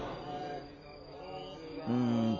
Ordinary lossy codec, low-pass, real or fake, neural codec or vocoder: none; 7.2 kHz; real; none